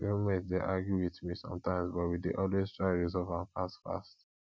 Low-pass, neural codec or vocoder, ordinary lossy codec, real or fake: none; none; none; real